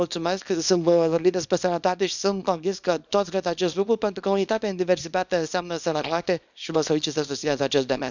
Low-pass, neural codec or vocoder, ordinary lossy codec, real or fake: 7.2 kHz; codec, 24 kHz, 0.9 kbps, WavTokenizer, small release; none; fake